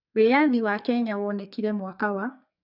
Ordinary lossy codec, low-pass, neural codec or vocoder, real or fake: none; 5.4 kHz; codec, 44.1 kHz, 2.6 kbps, SNAC; fake